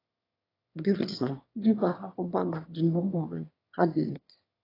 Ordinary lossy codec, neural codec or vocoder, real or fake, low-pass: AAC, 24 kbps; autoencoder, 22.05 kHz, a latent of 192 numbers a frame, VITS, trained on one speaker; fake; 5.4 kHz